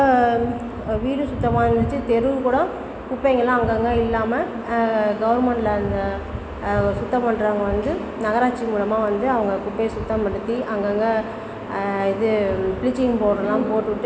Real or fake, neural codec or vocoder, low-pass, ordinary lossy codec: real; none; none; none